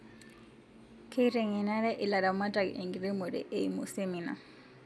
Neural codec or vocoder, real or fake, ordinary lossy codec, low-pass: none; real; none; none